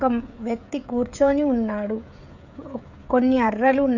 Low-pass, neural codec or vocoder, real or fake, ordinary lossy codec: 7.2 kHz; codec, 16 kHz, 16 kbps, FreqCodec, smaller model; fake; none